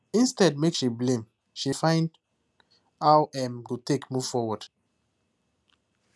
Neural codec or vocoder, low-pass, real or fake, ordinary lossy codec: none; none; real; none